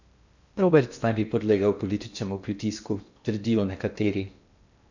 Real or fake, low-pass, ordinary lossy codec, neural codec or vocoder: fake; 7.2 kHz; none; codec, 16 kHz in and 24 kHz out, 0.8 kbps, FocalCodec, streaming, 65536 codes